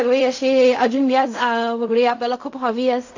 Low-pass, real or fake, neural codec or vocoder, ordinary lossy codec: 7.2 kHz; fake; codec, 16 kHz in and 24 kHz out, 0.4 kbps, LongCat-Audio-Codec, fine tuned four codebook decoder; AAC, 48 kbps